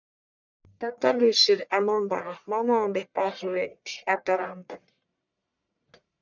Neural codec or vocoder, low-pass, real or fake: codec, 44.1 kHz, 1.7 kbps, Pupu-Codec; 7.2 kHz; fake